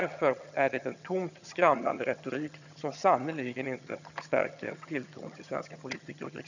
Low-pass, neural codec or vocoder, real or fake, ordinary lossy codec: 7.2 kHz; vocoder, 22.05 kHz, 80 mel bands, HiFi-GAN; fake; none